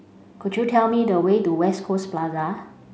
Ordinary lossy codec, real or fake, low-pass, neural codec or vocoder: none; real; none; none